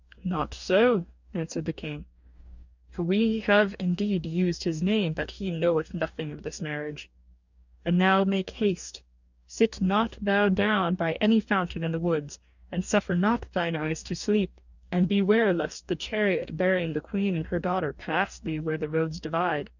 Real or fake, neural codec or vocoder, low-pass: fake; codec, 44.1 kHz, 2.6 kbps, DAC; 7.2 kHz